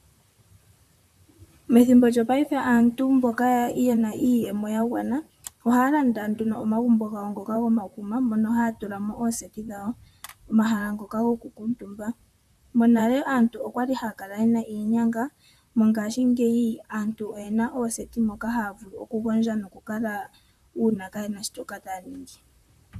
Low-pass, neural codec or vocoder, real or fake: 14.4 kHz; vocoder, 44.1 kHz, 128 mel bands, Pupu-Vocoder; fake